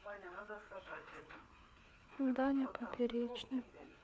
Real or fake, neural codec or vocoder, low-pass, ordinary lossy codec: fake; codec, 16 kHz, 4 kbps, FreqCodec, larger model; none; none